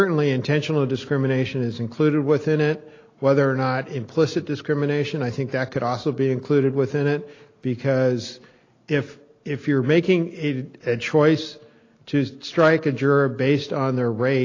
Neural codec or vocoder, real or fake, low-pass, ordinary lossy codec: none; real; 7.2 kHz; AAC, 32 kbps